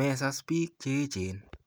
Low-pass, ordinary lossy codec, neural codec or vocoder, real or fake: none; none; none; real